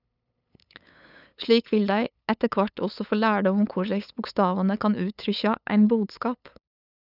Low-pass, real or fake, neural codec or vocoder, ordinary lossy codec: 5.4 kHz; fake; codec, 16 kHz, 8 kbps, FunCodec, trained on LibriTTS, 25 frames a second; none